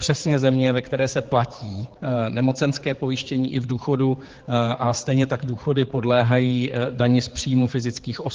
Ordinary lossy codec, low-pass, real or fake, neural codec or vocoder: Opus, 16 kbps; 7.2 kHz; fake; codec, 16 kHz, 4 kbps, X-Codec, HuBERT features, trained on general audio